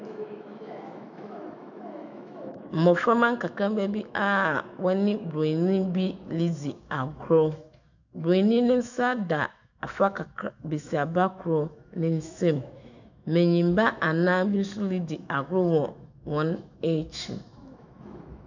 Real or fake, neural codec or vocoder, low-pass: fake; autoencoder, 48 kHz, 128 numbers a frame, DAC-VAE, trained on Japanese speech; 7.2 kHz